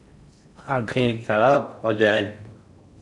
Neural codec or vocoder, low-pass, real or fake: codec, 16 kHz in and 24 kHz out, 0.6 kbps, FocalCodec, streaming, 4096 codes; 10.8 kHz; fake